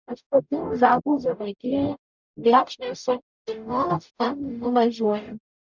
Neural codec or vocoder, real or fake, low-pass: codec, 44.1 kHz, 0.9 kbps, DAC; fake; 7.2 kHz